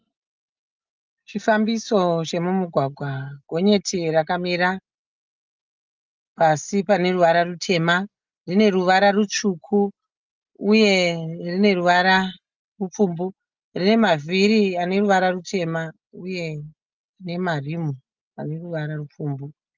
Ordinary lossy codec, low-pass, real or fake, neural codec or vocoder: Opus, 24 kbps; 7.2 kHz; real; none